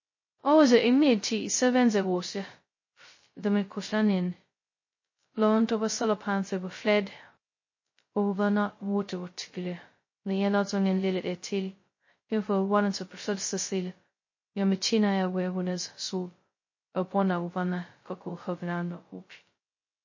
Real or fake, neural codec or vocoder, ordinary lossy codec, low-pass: fake; codec, 16 kHz, 0.2 kbps, FocalCodec; MP3, 32 kbps; 7.2 kHz